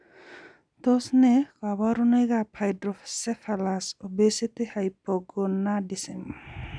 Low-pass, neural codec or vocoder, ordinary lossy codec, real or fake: 9.9 kHz; none; none; real